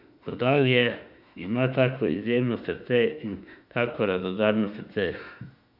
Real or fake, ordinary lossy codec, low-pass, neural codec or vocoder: fake; none; 5.4 kHz; autoencoder, 48 kHz, 32 numbers a frame, DAC-VAE, trained on Japanese speech